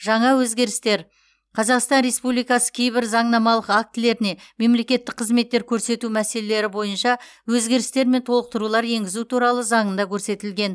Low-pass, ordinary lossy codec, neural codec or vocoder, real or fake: none; none; none; real